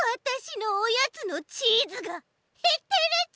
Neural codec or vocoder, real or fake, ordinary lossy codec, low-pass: none; real; none; none